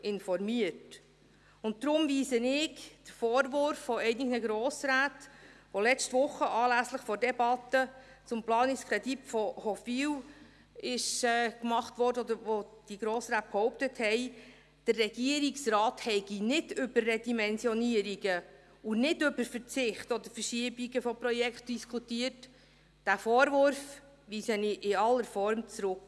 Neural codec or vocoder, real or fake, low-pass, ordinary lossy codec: none; real; none; none